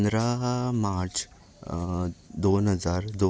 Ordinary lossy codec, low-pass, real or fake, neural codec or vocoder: none; none; real; none